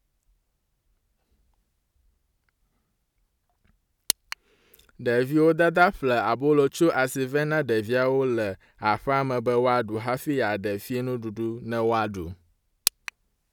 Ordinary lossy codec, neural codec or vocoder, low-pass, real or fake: none; none; 19.8 kHz; real